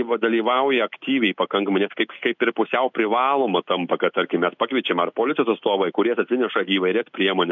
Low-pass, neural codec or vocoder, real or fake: 7.2 kHz; none; real